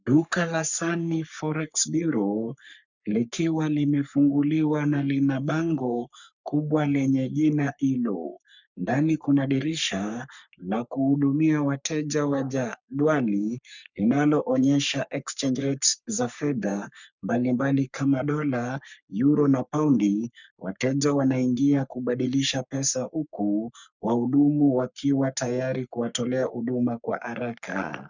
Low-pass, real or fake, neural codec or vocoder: 7.2 kHz; fake; codec, 44.1 kHz, 3.4 kbps, Pupu-Codec